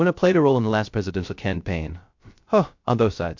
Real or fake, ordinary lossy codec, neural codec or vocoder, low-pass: fake; MP3, 48 kbps; codec, 16 kHz, 0.3 kbps, FocalCodec; 7.2 kHz